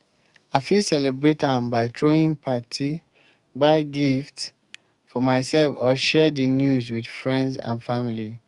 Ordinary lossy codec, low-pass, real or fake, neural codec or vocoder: Opus, 64 kbps; 10.8 kHz; fake; codec, 44.1 kHz, 2.6 kbps, SNAC